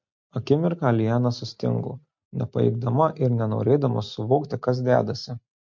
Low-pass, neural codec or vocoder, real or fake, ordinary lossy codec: 7.2 kHz; none; real; MP3, 48 kbps